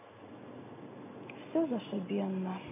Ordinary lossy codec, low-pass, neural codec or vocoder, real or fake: none; 3.6 kHz; none; real